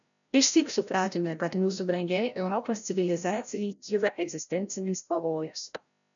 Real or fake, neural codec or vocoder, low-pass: fake; codec, 16 kHz, 0.5 kbps, FreqCodec, larger model; 7.2 kHz